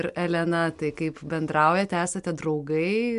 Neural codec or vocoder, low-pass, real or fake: none; 10.8 kHz; real